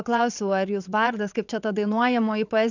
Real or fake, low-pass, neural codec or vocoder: fake; 7.2 kHz; vocoder, 22.05 kHz, 80 mel bands, WaveNeXt